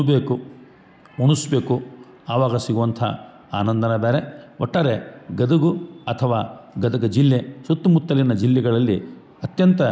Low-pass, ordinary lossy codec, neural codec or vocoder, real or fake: none; none; none; real